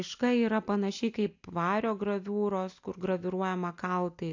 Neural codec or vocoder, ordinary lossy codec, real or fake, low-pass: none; AAC, 48 kbps; real; 7.2 kHz